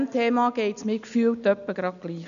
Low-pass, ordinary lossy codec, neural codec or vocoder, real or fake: 7.2 kHz; none; none; real